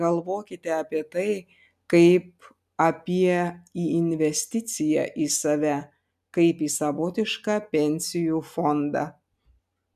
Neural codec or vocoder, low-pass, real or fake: none; 14.4 kHz; real